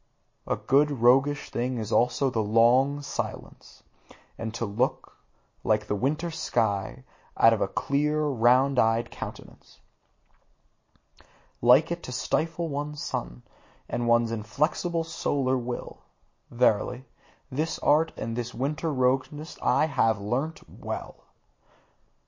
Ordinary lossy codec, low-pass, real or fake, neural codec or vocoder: MP3, 32 kbps; 7.2 kHz; real; none